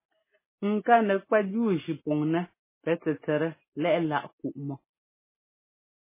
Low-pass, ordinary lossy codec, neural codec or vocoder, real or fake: 3.6 kHz; MP3, 16 kbps; none; real